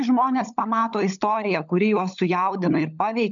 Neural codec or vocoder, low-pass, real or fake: codec, 16 kHz, 16 kbps, FunCodec, trained on LibriTTS, 50 frames a second; 7.2 kHz; fake